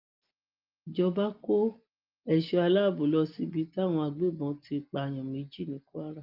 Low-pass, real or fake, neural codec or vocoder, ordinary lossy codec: 5.4 kHz; real; none; Opus, 24 kbps